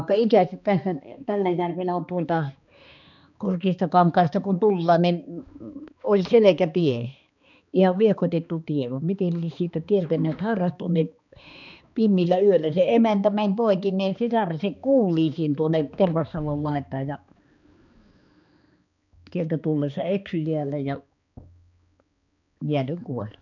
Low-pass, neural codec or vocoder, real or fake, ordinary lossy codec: 7.2 kHz; codec, 16 kHz, 2 kbps, X-Codec, HuBERT features, trained on balanced general audio; fake; none